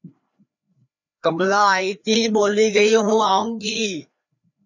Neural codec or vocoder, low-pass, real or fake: codec, 16 kHz, 2 kbps, FreqCodec, larger model; 7.2 kHz; fake